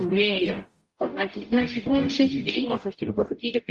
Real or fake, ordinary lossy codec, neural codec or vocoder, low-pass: fake; Opus, 32 kbps; codec, 44.1 kHz, 0.9 kbps, DAC; 10.8 kHz